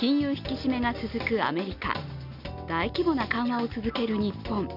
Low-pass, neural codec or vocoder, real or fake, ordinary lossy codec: 5.4 kHz; none; real; none